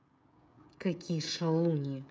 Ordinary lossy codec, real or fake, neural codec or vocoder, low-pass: none; fake; codec, 16 kHz, 16 kbps, FreqCodec, smaller model; none